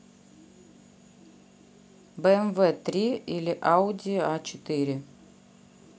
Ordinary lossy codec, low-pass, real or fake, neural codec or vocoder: none; none; real; none